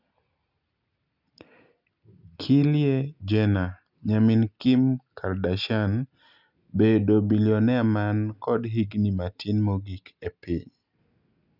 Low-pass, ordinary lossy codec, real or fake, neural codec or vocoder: 5.4 kHz; none; real; none